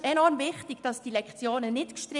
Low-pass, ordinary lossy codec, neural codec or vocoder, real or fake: 10.8 kHz; none; none; real